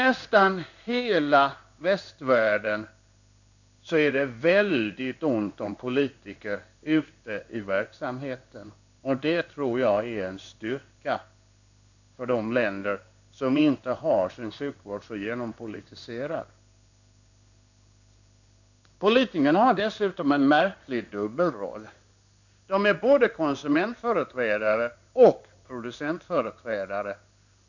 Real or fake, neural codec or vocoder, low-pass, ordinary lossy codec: fake; codec, 16 kHz in and 24 kHz out, 1 kbps, XY-Tokenizer; 7.2 kHz; none